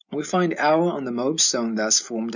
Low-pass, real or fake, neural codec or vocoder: 7.2 kHz; real; none